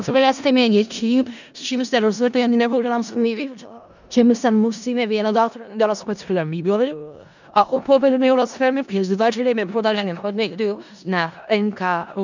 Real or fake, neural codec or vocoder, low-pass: fake; codec, 16 kHz in and 24 kHz out, 0.4 kbps, LongCat-Audio-Codec, four codebook decoder; 7.2 kHz